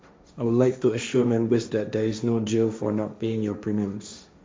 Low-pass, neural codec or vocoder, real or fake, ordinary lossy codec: none; codec, 16 kHz, 1.1 kbps, Voila-Tokenizer; fake; none